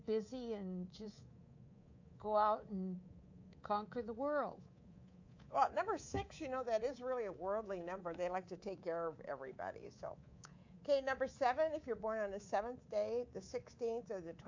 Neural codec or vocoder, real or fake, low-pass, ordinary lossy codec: codec, 24 kHz, 3.1 kbps, DualCodec; fake; 7.2 kHz; AAC, 48 kbps